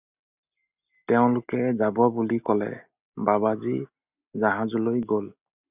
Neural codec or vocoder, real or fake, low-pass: none; real; 3.6 kHz